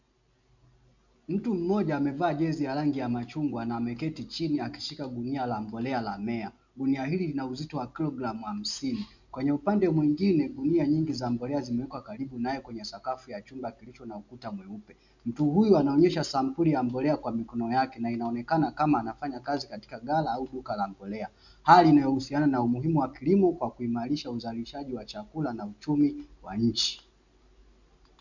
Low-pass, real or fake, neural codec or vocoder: 7.2 kHz; real; none